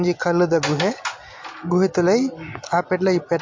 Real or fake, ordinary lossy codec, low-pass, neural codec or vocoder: real; MP3, 48 kbps; 7.2 kHz; none